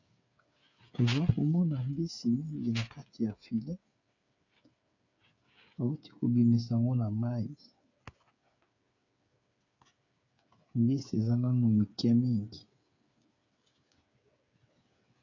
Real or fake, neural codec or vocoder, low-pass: fake; codec, 16 kHz, 8 kbps, FreqCodec, smaller model; 7.2 kHz